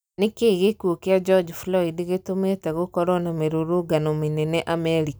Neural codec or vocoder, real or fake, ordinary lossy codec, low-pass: none; real; none; none